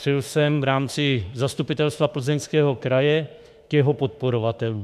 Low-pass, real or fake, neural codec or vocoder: 14.4 kHz; fake; autoencoder, 48 kHz, 32 numbers a frame, DAC-VAE, trained on Japanese speech